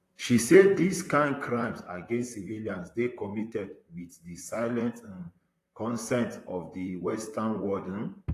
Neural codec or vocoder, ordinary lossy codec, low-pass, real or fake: vocoder, 44.1 kHz, 128 mel bands, Pupu-Vocoder; AAC, 48 kbps; 14.4 kHz; fake